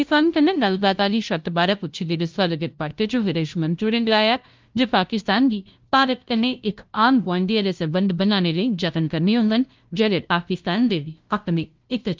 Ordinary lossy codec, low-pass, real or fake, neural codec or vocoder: Opus, 32 kbps; 7.2 kHz; fake; codec, 16 kHz, 0.5 kbps, FunCodec, trained on LibriTTS, 25 frames a second